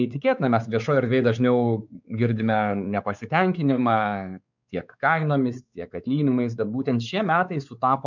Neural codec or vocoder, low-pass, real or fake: codec, 16 kHz, 4 kbps, X-Codec, WavLM features, trained on Multilingual LibriSpeech; 7.2 kHz; fake